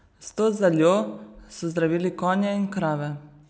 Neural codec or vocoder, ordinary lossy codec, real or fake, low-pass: none; none; real; none